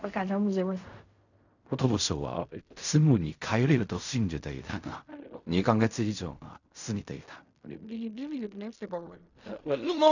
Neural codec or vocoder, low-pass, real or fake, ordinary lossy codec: codec, 16 kHz in and 24 kHz out, 0.4 kbps, LongCat-Audio-Codec, fine tuned four codebook decoder; 7.2 kHz; fake; none